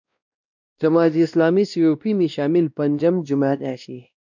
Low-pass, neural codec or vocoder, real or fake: 7.2 kHz; codec, 16 kHz, 1 kbps, X-Codec, WavLM features, trained on Multilingual LibriSpeech; fake